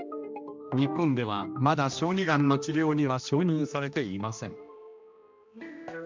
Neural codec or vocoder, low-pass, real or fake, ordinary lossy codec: codec, 16 kHz, 1 kbps, X-Codec, HuBERT features, trained on general audio; 7.2 kHz; fake; MP3, 64 kbps